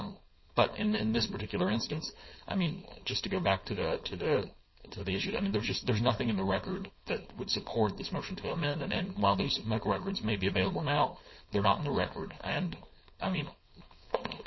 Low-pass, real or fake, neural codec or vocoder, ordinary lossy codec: 7.2 kHz; fake; codec, 16 kHz, 4.8 kbps, FACodec; MP3, 24 kbps